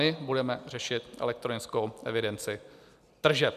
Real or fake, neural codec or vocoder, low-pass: real; none; 14.4 kHz